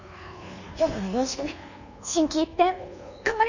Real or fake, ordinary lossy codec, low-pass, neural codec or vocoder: fake; none; 7.2 kHz; codec, 24 kHz, 1.2 kbps, DualCodec